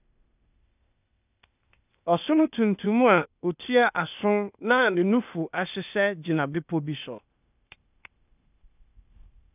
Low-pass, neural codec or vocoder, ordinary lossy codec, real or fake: 3.6 kHz; codec, 16 kHz, 0.8 kbps, ZipCodec; none; fake